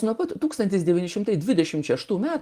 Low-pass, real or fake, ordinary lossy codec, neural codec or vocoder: 14.4 kHz; real; Opus, 24 kbps; none